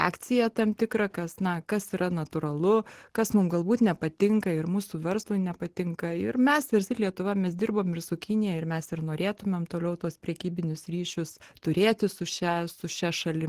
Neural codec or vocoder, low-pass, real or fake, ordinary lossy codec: none; 14.4 kHz; real; Opus, 16 kbps